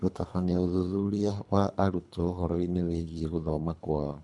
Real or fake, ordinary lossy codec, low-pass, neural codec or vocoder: fake; none; 10.8 kHz; codec, 24 kHz, 3 kbps, HILCodec